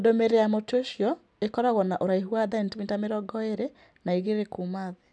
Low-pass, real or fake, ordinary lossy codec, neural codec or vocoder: none; real; none; none